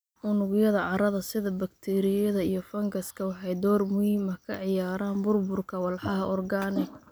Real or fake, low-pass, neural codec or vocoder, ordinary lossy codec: real; none; none; none